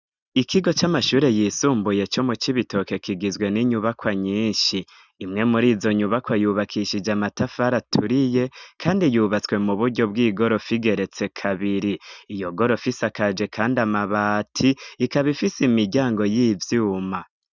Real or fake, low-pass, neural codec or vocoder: real; 7.2 kHz; none